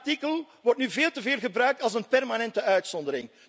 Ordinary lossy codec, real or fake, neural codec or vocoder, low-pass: none; real; none; none